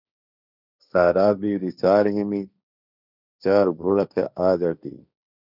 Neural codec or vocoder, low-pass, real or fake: codec, 16 kHz, 1.1 kbps, Voila-Tokenizer; 5.4 kHz; fake